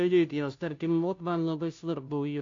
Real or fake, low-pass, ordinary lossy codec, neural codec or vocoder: fake; 7.2 kHz; AAC, 48 kbps; codec, 16 kHz, 0.5 kbps, FunCodec, trained on Chinese and English, 25 frames a second